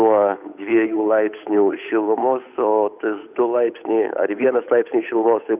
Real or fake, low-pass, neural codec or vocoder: fake; 3.6 kHz; codec, 16 kHz, 8 kbps, FunCodec, trained on Chinese and English, 25 frames a second